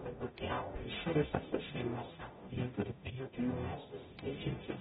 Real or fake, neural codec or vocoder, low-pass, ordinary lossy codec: fake; codec, 44.1 kHz, 0.9 kbps, DAC; 19.8 kHz; AAC, 16 kbps